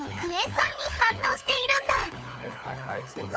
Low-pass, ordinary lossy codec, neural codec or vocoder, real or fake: none; none; codec, 16 kHz, 4 kbps, FunCodec, trained on LibriTTS, 50 frames a second; fake